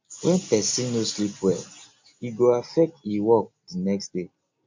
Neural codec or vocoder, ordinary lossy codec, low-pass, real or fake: none; MP3, 48 kbps; 7.2 kHz; real